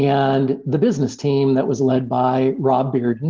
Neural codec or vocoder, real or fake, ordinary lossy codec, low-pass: none; real; Opus, 16 kbps; 7.2 kHz